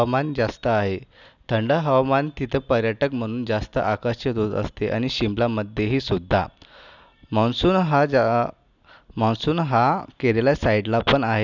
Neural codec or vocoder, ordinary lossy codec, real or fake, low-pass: none; none; real; 7.2 kHz